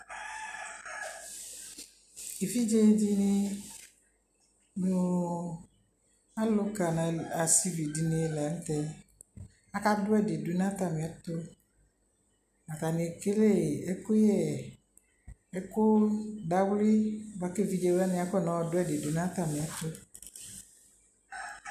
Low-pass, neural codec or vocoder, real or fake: 14.4 kHz; none; real